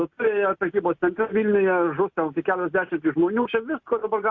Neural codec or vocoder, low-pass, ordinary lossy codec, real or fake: none; 7.2 kHz; Opus, 64 kbps; real